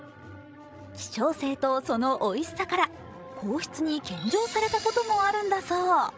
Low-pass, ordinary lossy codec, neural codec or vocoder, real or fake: none; none; codec, 16 kHz, 16 kbps, FreqCodec, larger model; fake